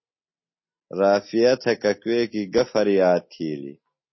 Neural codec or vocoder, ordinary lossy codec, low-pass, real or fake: none; MP3, 24 kbps; 7.2 kHz; real